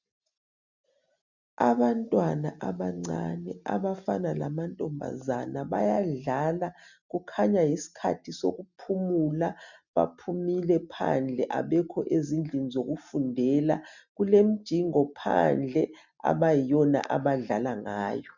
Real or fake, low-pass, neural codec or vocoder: real; 7.2 kHz; none